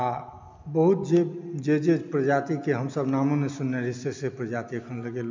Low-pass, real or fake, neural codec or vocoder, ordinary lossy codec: 7.2 kHz; real; none; MP3, 64 kbps